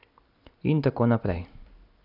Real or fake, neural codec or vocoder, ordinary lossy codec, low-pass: real; none; none; 5.4 kHz